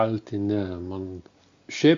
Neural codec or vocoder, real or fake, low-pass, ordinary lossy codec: none; real; 7.2 kHz; Opus, 64 kbps